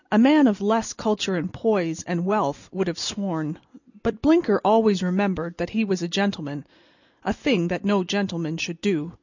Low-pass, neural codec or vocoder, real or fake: 7.2 kHz; none; real